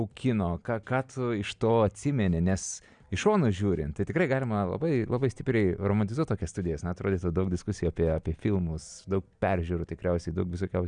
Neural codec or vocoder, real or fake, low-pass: none; real; 9.9 kHz